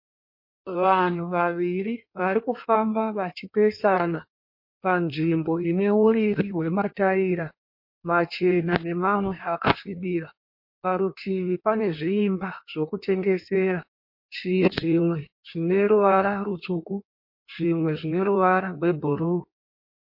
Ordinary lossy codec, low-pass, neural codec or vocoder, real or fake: MP3, 32 kbps; 5.4 kHz; codec, 16 kHz in and 24 kHz out, 1.1 kbps, FireRedTTS-2 codec; fake